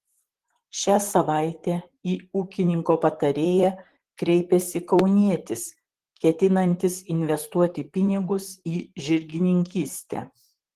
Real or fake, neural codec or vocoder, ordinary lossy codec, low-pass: fake; vocoder, 44.1 kHz, 128 mel bands, Pupu-Vocoder; Opus, 16 kbps; 14.4 kHz